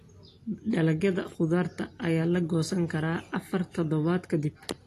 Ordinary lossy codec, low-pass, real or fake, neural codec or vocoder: AAC, 48 kbps; 14.4 kHz; real; none